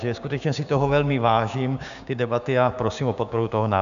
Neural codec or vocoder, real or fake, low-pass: codec, 16 kHz, 6 kbps, DAC; fake; 7.2 kHz